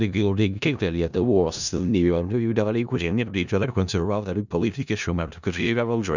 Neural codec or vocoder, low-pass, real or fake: codec, 16 kHz in and 24 kHz out, 0.4 kbps, LongCat-Audio-Codec, four codebook decoder; 7.2 kHz; fake